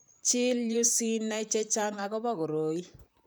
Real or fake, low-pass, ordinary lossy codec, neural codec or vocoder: fake; none; none; vocoder, 44.1 kHz, 128 mel bands, Pupu-Vocoder